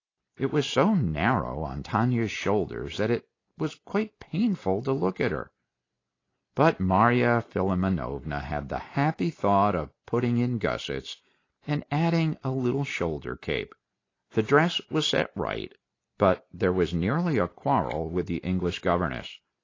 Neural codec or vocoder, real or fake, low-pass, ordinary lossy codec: none; real; 7.2 kHz; AAC, 32 kbps